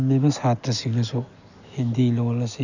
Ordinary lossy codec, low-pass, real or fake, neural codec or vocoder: none; 7.2 kHz; real; none